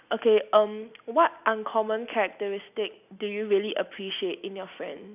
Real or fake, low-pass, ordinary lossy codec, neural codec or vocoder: real; 3.6 kHz; none; none